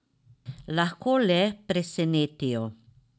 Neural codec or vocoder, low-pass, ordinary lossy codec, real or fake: none; none; none; real